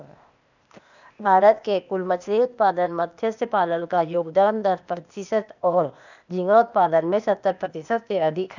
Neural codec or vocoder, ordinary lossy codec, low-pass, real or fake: codec, 16 kHz, 0.8 kbps, ZipCodec; none; 7.2 kHz; fake